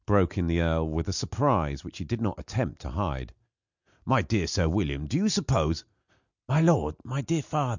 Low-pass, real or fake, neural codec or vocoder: 7.2 kHz; real; none